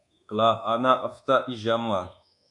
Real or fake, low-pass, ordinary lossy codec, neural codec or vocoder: fake; 10.8 kHz; AAC, 64 kbps; codec, 24 kHz, 1.2 kbps, DualCodec